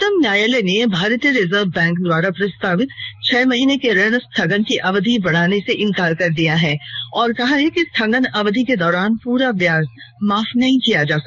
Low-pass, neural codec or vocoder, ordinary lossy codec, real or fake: 7.2 kHz; codec, 16 kHz in and 24 kHz out, 1 kbps, XY-Tokenizer; none; fake